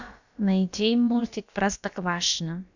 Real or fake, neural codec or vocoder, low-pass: fake; codec, 16 kHz, about 1 kbps, DyCAST, with the encoder's durations; 7.2 kHz